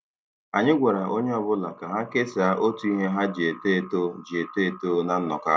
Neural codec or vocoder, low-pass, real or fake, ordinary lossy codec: none; none; real; none